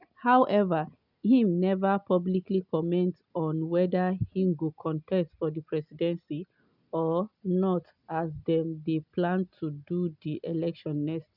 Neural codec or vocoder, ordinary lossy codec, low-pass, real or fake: none; none; 5.4 kHz; real